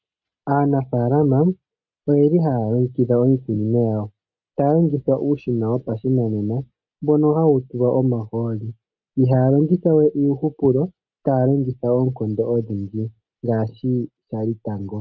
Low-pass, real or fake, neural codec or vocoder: 7.2 kHz; real; none